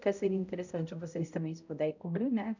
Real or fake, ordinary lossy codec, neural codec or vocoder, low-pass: fake; none; codec, 16 kHz, 0.5 kbps, X-Codec, HuBERT features, trained on balanced general audio; 7.2 kHz